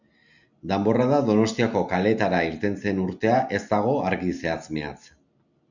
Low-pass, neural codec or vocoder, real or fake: 7.2 kHz; none; real